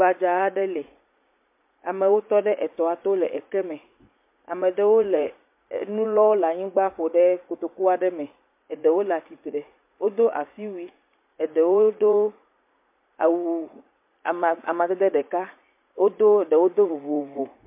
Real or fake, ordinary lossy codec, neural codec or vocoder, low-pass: fake; MP3, 24 kbps; vocoder, 44.1 kHz, 80 mel bands, Vocos; 3.6 kHz